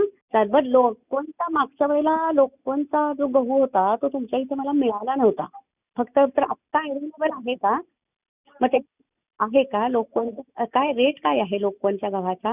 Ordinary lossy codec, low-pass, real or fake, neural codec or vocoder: none; 3.6 kHz; real; none